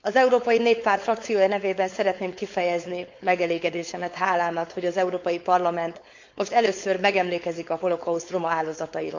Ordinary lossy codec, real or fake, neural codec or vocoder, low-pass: MP3, 64 kbps; fake; codec, 16 kHz, 4.8 kbps, FACodec; 7.2 kHz